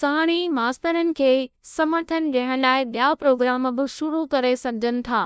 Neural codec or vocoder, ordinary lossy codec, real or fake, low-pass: codec, 16 kHz, 0.5 kbps, FunCodec, trained on LibriTTS, 25 frames a second; none; fake; none